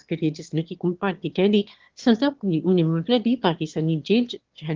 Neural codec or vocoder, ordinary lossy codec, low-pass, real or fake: autoencoder, 22.05 kHz, a latent of 192 numbers a frame, VITS, trained on one speaker; Opus, 16 kbps; 7.2 kHz; fake